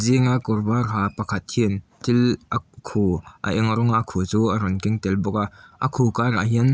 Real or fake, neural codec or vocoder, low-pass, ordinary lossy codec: real; none; none; none